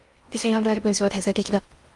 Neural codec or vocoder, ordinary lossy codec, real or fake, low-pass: codec, 16 kHz in and 24 kHz out, 0.6 kbps, FocalCodec, streaming, 2048 codes; Opus, 32 kbps; fake; 10.8 kHz